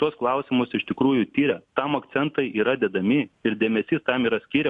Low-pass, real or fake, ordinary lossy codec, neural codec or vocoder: 10.8 kHz; real; MP3, 64 kbps; none